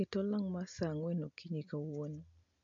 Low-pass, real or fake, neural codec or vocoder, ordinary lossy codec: 7.2 kHz; real; none; MP3, 48 kbps